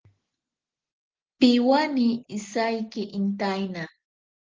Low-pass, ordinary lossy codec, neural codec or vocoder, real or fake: 7.2 kHz; Opus, 16 kbps; none; real